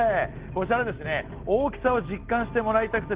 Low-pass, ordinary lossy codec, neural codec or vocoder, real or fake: 3.6 kHz; Opus, 16 kbps; none; real